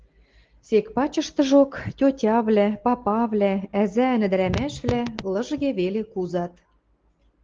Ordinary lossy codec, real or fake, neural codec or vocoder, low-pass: Opus, 16 kbps; real; none; 7.2 kHz